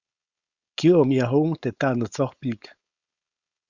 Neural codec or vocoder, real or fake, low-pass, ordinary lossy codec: codec, 16 kHz, 4.8 kbps, FACodec; fake; 7.2 kHz; Opus, 64 kbps